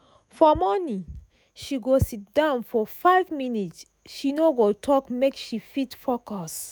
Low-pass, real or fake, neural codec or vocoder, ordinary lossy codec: none; fake; autoencoder, 48 kHz, 128 numbers a frame, DAC-VAE, trained on Japanese speech; none